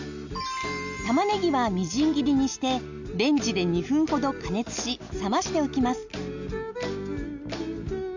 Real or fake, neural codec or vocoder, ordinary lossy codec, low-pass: real; none; none; 7.2 kHz